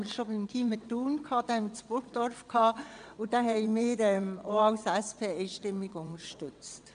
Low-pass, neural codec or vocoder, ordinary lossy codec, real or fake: 9.9 kHz; vocoder, 22.05 kHz, 80 mel bands, WaveNeXt; AAC, 96 kbps; fake